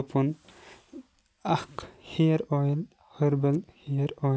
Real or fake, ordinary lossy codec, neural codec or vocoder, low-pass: real; none; none; none